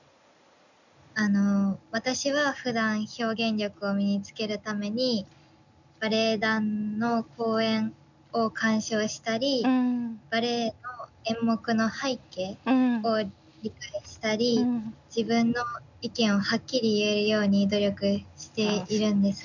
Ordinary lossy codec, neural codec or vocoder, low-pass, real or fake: none; none; 7.2 kHz; real